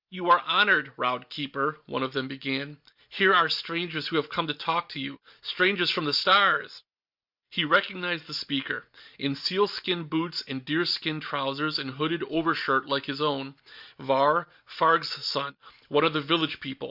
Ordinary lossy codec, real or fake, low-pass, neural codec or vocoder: Opus, 64 kbps; real; 5.4 kHz; none